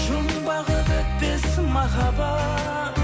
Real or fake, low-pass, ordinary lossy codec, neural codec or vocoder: real; none; none; none